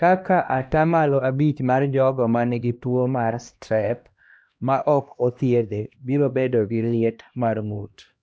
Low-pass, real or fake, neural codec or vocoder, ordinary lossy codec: none; fake; codec, 16 kHz, 1 kbps, X-Codec, HuBERT features, trained on LibriSpeech; none